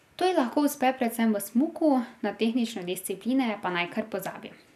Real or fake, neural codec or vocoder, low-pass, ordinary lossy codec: real; none; 14.4 kHz; none